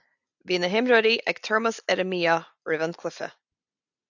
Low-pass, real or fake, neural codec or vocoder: 7.2 kHz; real; none